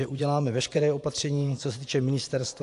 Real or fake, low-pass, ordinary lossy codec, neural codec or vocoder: fake; 10.8 kHz; AAC, 64 kbps; vocoder, 24 kHz, 100 mel bands, Vocos